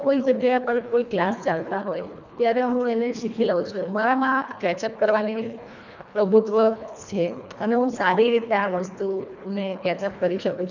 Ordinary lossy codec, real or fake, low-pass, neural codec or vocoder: none; fake; 7.2 kHz; codec, 24 kHz, 1.5 kbps, HILCodec